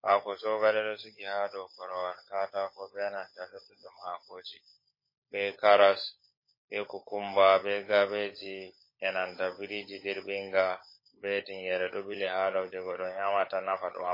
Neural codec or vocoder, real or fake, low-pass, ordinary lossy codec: codec, 16 kHz, 16 kbps, FunCodec, trained on LibriTTS, 50 frames a second; fake; 5.4 kHz; MP3, 24 kbps